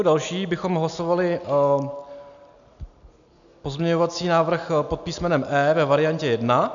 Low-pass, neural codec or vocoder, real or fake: 7.2 kHz; none; real